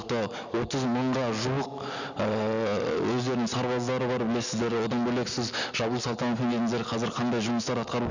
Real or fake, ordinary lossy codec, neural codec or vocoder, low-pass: real; none; none; 7.2 kHz